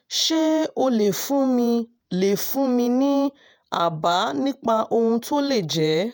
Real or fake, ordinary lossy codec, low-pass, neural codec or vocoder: fake; none; none; vocoder, 48 kHz, 128 mel bands, Vocos